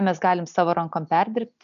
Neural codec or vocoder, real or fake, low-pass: none; real; 7.2 kHz